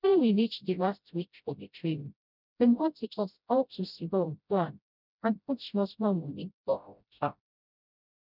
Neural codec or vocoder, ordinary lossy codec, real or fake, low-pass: codec, 16 kHz, 0.5 kbps, FreqCodec, smaller model; none; fake; 5.4 kHz